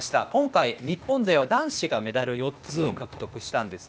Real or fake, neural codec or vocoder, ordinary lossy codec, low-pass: fake; codec, 16 kHz, 0.8 kbps, ZipCodec; none; none